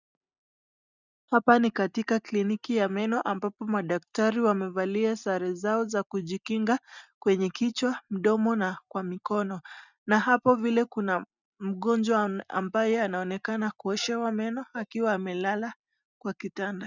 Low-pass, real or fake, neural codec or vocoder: 7.2 kHz; real; none